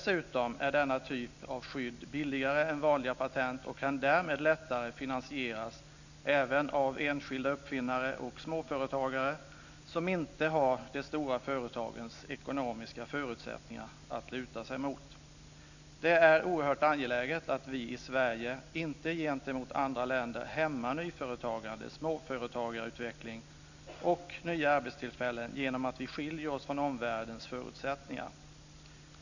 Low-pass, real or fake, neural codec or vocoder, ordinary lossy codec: 7.2 kHz; real; none; none